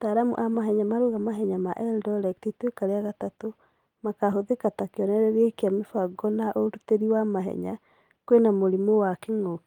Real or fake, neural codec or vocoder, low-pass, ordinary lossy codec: real; none; 19.8 kHz; Opus, 32 kbps